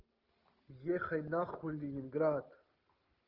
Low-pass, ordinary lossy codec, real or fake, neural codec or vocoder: 5.4 kHz; Opus, 24 kbps; real; none